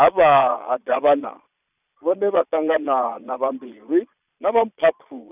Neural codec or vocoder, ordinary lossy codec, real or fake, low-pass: vocoder, 22.05 kHz, 80 mel bands, Vocos; none; fake; 3.6 kHz